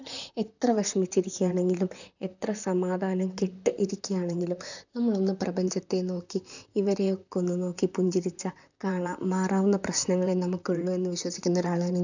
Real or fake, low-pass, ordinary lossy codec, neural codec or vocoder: fake; 7.2 kHz; none; vocoder, 44.1 kHz, 128 mel bands, Pupu-Vocoder